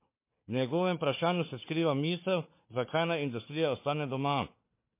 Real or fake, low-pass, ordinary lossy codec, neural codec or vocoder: fake; 3.6 kHz; MP3, 24 kbps; codec, 16 kHz, 4 kbps, FunCodec, trained on Chinese and English, 50 frames a second